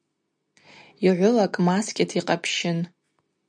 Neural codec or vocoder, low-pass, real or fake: none; 9.9 kHz; real